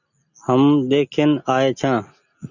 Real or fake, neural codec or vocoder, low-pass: real; none; 7.2 kHz